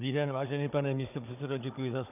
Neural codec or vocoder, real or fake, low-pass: codec, 16 kHz, 16 kbps, FunCodec, trained on Chinese and English, 50 frames a second; fake; 3.6 kHz